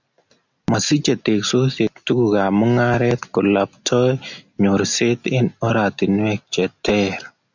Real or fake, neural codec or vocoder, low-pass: real; none; 7.2 kHz